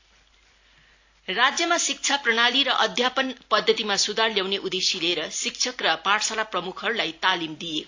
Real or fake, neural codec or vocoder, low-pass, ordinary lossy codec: real; none; 7.2 kHz; AAC, 48 kbps